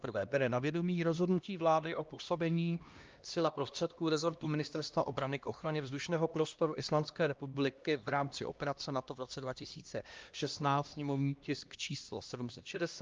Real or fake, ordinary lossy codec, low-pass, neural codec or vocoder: fake; Opus, 24 kbps; 7.2 kHz; codec, 16 kHz, 1 kbps, X-Codec, HuBERT features, trained on LibriSpeech